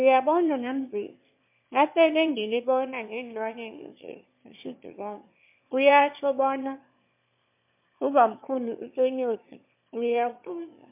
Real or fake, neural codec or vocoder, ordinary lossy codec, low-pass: fake; autoencoder, 22.05 kHz, a latent of 192 numbers a frame, VITS, trained on one speaker; MP3, 32 kbps; 3.6 kHz